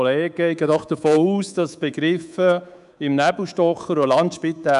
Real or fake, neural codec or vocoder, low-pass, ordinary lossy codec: fake; codec, 24 kHz, 3.1 kbps, DualCodec; 10.8 kHz; none